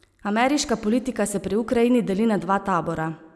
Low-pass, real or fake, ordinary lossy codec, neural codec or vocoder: none; real; none; none